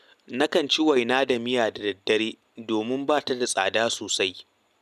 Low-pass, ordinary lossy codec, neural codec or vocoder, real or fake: 14.4 kHz; none; none; real